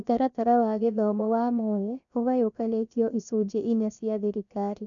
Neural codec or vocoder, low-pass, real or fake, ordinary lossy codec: codec, 16 kHz, 0.7 kbps, FocalCodec; 7.2 kHz; fake; none